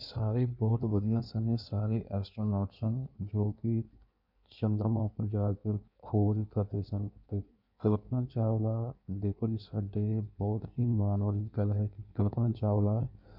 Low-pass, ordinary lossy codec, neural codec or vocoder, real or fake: 5.4 kHz; none; codec, 16 kHz in and 24 kHz out, 1.1 kbps, FireRedTTS-2 codec; fake